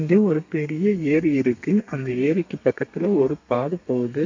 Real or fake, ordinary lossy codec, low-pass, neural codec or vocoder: fake; MP3, 48 kbps; 7.2 kHz; codec, 32 kHz, 1.9 kbps, SNAC